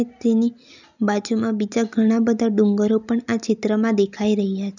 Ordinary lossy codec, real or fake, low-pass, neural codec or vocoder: none; real; 7.2 kHz; none